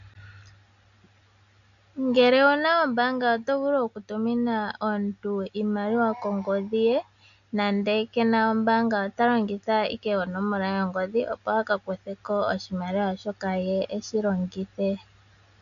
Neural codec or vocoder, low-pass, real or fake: none; 7.2 kHz; real